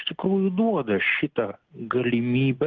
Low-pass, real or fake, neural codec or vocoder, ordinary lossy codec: 7.2 kHz; real; none; Opus, 24 kbps